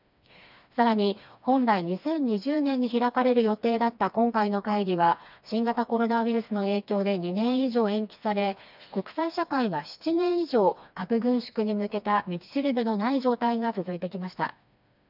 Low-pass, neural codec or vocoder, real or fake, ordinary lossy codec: 5.4 kHz; codec, 16 kHz, 2 kbps, FreqCodec, smaller model; fake; none